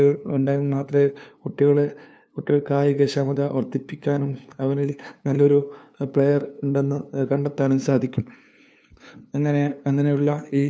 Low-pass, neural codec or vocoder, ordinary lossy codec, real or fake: none; codec, 16 kHz, 2 kbps, FunCodec, trained on LibriTTS, 25 frames a second; none; fake